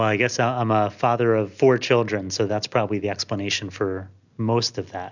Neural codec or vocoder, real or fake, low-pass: none; real; 7.2 kHz